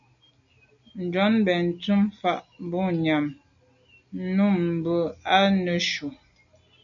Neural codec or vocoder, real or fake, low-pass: none; real; 7.2 kHz